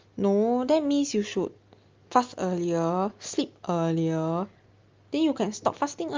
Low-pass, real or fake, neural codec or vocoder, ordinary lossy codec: 7.2 kHz; real; none; Opus, 32 kbps